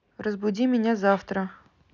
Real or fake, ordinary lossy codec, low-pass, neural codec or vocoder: real; none; 7.2 kHz; none